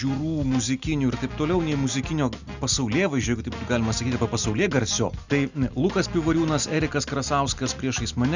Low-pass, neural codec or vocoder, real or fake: 7.2 kHz; none; real